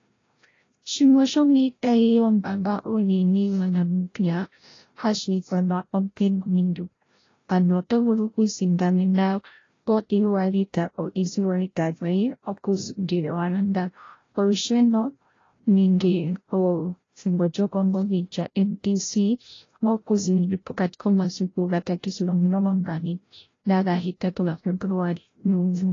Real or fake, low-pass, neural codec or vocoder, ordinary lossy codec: fake; 7.2 kHz; codec, 16 kHz, 0.5 kbps, FreqCodec, larger model; AAC, 32 kbps